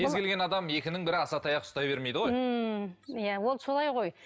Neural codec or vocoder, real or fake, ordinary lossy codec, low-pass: none; real; none; none